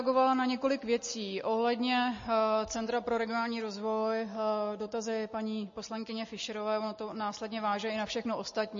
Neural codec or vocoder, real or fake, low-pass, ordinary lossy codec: none; real; 7.2 kHz; MP3, 32 kbps